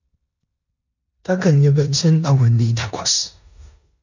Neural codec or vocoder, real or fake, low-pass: codec, 16 kHz in and 24 kHz out, 0.9 kbps, LongCat-Audio-Codec, four codebook decoder; fake; 7.2 kHz